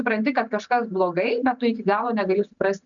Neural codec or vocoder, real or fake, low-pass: none; real; 7.2 kHz